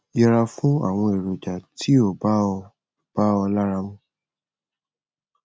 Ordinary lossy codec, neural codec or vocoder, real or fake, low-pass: none; none; real; none